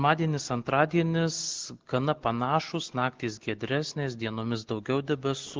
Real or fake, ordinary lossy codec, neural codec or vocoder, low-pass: real; Opus, 16 kbps; none; 7.2 kHz